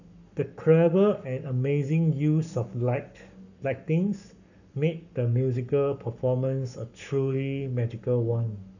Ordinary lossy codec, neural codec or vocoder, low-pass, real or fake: none; codec, 44.1 kHz, 7.8 kbps, Pupu-Codec; 7.2 kHz; fake